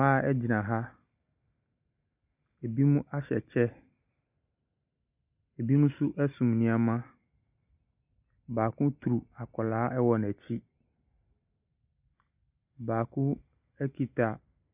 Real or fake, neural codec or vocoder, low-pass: real; none; 3.6 kHz